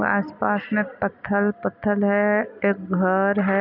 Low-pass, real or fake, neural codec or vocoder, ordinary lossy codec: 5.4 kHz; real; none; none